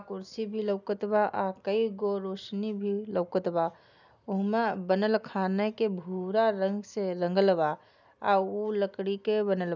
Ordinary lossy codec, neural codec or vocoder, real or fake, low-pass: none; none; real; 7.2 kHz